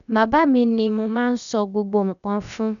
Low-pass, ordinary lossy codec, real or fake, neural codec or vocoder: 7.2 kHz; none; fake; codec, 16 kHz, about 1 kbps, DyCAST, with the encoder's durations